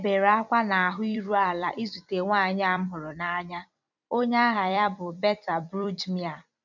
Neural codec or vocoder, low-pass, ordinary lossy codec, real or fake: vocoder, 44.1 kHz, 128 mel bands every 256 samples, BigVGAN v2; 7.2 kHz; none; fake